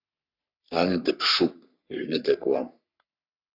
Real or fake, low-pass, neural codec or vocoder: fake; 5.4 kHz; codec, 44.1 kHz, 3.4 kbps, Pupu-Codec